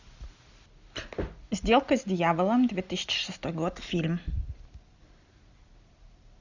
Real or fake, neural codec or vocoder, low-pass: real; none; 7.2 kHz